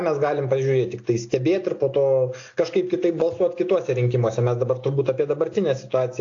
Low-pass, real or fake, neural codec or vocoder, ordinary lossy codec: 7.2 kHz; real; none; AAC, 48 kbps